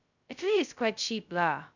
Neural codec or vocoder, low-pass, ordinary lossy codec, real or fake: codec, 16 kHz, 0.2 kbps, FocalCodec; 7.2 kHz; none; fake